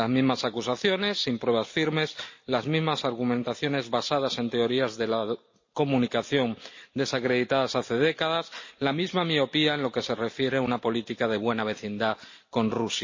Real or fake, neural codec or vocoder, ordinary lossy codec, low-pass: real; none; MP3, 32 kbps; 7.2 kHz